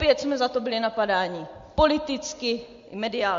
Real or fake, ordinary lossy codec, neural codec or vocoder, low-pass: real; MP3, 48 kbps; none; 7.2 kHz